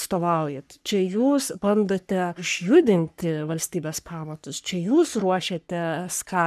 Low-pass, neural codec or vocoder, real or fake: 14.4 kHz; codec, 44.1 kHz, 3.4 kbps, Pupu-Codec; fake